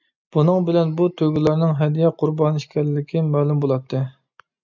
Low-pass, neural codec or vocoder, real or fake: 7.2 kHz; none; real